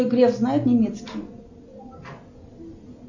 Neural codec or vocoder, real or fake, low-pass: none; real; 7.2 kHz